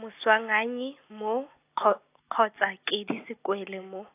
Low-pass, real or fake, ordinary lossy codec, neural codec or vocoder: 3.6 kHz; real; AAC, 24 kbps; none